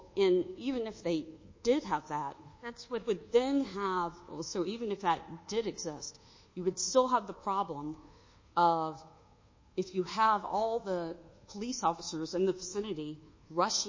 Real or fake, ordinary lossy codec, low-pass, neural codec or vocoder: fake; MP3, 32 kbps; 7.2 kHz; codec, 24 kHz, 1.2 kbps, DualCodec